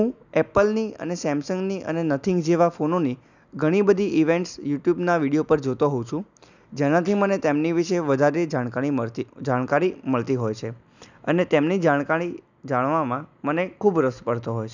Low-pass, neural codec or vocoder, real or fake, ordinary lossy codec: 7.2 kHz; none; real; none